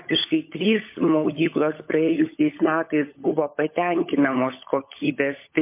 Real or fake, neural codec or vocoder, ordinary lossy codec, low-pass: fake; codec, 16 kHz, 16 kbps, FunCodec, trained on LibriTTS, 50 frames a second; MP3, 24 kbps; 3.6 kHz